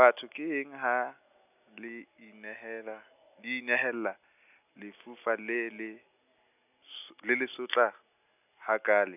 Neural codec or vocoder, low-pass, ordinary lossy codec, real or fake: none; 3.6 kHz; none; real